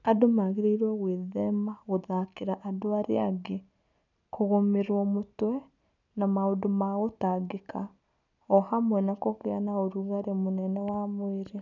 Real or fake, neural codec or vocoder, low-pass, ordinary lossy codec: real; none; 7.2 kHz; none